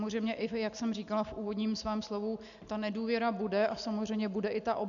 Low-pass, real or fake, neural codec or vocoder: 7.2 kHz; real; none